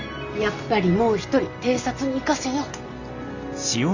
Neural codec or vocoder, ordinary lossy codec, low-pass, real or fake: none; Opus, 64 kbps; 7.2 kHz; real